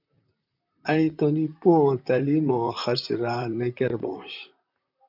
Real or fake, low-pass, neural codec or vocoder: fake; 5.4 kHz; vocoder, 44.1 kHz, 128 mel bands, Pupu-Vocoder